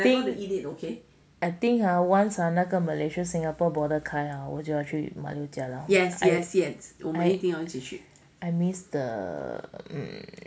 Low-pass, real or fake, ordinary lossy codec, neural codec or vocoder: none; real; none; none